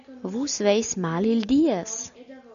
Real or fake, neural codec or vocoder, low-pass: real; none; 7.2 kHz